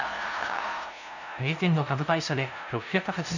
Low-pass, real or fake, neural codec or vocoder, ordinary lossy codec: 7.2 kHz; fake; codec, 16 kHz, 0.5 kbps, FunCodec, trained on LibriTTS, 25 frames a second; none